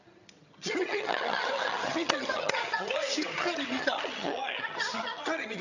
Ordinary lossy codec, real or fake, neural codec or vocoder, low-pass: none; fake; vocoder, 22.05 kHz, 80 mel bands, HiFi-GAN; 7.2 kHz